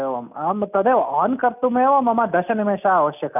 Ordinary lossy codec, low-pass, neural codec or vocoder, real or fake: none; 3.6 kHz; none; real